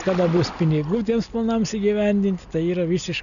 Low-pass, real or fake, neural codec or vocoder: 7.2 kHz; real; none